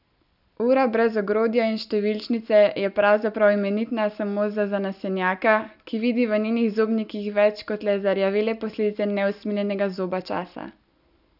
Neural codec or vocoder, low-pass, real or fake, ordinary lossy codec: none; 5.4 kHz; real; none